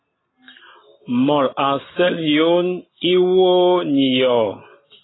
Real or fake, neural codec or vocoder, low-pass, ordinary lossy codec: real; none; 7.2 kHz; AAC, 16 kbps